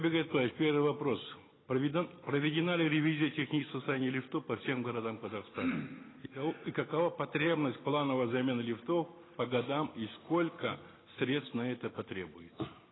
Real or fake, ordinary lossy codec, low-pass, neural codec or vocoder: real; AAC, 16 kbps; 7.2 kHz; none